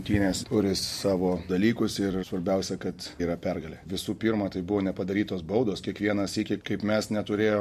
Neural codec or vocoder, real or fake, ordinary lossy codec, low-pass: none; real; MP3, 64 kbps; 14.4 kHz